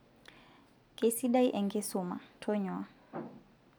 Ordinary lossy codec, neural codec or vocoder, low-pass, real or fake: none; none; none; real